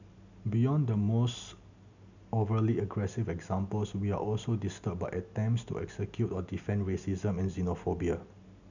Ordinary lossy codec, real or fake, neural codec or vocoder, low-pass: none; real; none; 7.2 kHz